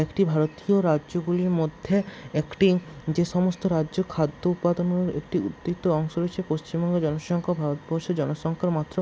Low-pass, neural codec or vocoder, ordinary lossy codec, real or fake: none; none; none; real